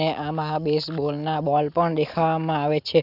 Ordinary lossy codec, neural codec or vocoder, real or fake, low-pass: none; none; real; 5.4 kHz